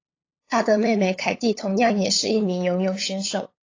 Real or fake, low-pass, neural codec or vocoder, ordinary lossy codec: fake; 7.2 kHz; codec, 16 kHz, 8 kbps, FunCodec, trained on LibriTTS, 25 frames a second; AAC, 32 kbps